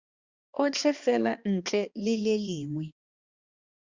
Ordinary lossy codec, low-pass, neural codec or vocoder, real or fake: Opus, 64 kbps; 7.2 kHz; codec, 16 kHz, 2 kbps, X-Codec, HuBERT features, trained on balanced general audio; fake